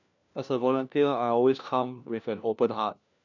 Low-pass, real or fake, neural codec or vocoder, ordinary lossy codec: 7.2 kHz; fake; codec, 16 kHz, 1 kbps, FunCodec, trained on LibriTTS, 50 frames a second; none